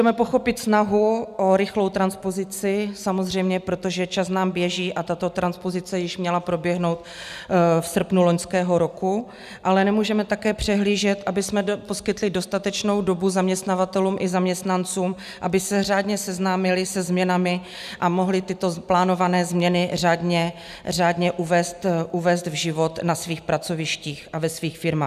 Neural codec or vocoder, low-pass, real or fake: none; 14.4 kHz; real